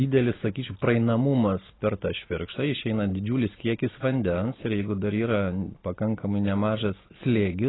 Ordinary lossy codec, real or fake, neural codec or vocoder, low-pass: AAC, 16 kbps; real; none; 7.2 kHz